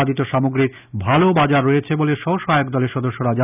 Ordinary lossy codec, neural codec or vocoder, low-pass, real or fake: none; none; 3.6 kHz; real